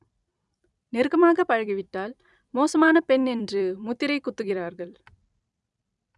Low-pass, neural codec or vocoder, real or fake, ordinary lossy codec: 10.8 kHz; vocoder, 44.1 kHz, 128 mel bands every 256 samples, BigVGAN v2; fake; none